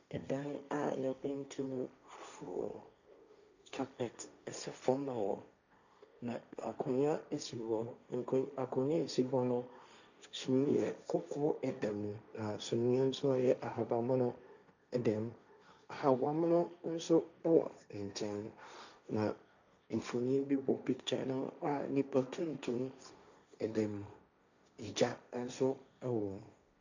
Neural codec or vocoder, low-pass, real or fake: codec, 16 kHz, 1.1 kbps, Voila-Tokenizer; 7.2 kHz; fake